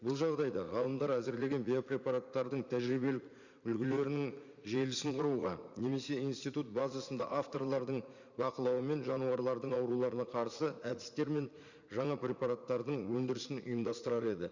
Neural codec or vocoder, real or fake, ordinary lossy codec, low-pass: vocoder, 44.1 kHz, 80 mel bands, Vocos; fake; Opus, 64 kbps; 7.2 kHz